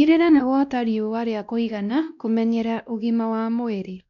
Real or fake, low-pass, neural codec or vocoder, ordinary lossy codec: fake; 7.2 kHz; codec, 16 kHz, 1 kbps, X-Codec, WavLM features, trained on Multilingual LibriSpeech; none